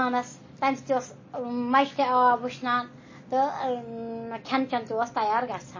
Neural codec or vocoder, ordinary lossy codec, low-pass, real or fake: none; MP3, 32 kbps; 7.2 kHz; real